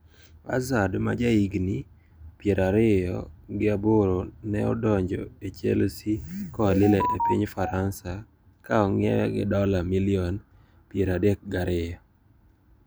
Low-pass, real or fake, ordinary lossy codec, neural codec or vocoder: none; real; none; none